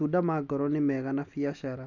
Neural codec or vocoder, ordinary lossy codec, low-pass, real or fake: none; none; 7.2 kHz; real